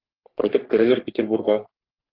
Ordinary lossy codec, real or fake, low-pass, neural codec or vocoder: Opus, 32 kbps; fake; 5.4 kHz; codec, 44.1 kHz, 3.4 kbps, Pupu-Codec